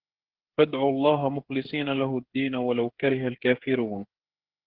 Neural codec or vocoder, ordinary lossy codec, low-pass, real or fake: codec, 16 kHz, 8 kbps, FreqCodec, smaller model; Opus, 16 kbps; 5.4 kHz; fake